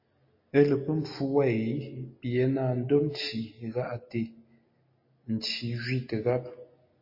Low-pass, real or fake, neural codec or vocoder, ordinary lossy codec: 5.4 kHz; real; none; MP3, 24 kbps